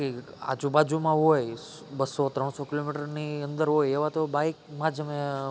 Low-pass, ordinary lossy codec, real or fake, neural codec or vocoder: none; none; real; none